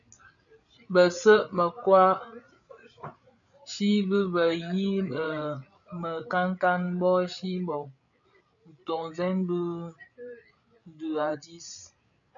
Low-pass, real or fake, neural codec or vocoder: 7.2 kHz; fake; codec, 16 kHz, 8 kbps, FreqCodec, larger model